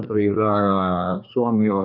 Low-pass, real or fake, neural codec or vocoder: 5.4 kHz; fake; codec, 24 kHz, 1 kbps, SNAC